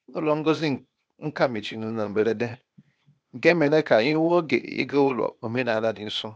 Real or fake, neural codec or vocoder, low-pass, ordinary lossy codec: fake; codec, 16 kHz, 0.8 kbps, ZipCodec; none; none